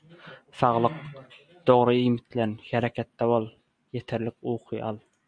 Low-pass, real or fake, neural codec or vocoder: 9.9 kHz; real; none